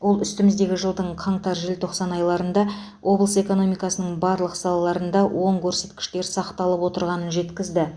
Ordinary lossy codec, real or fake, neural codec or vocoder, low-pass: Opus, 64 kbps; real; none; 9.9 kHz